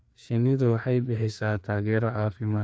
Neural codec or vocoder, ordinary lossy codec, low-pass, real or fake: codec, 16 kHz, 2 kbps, FreqCodec, larger model; none; none; fake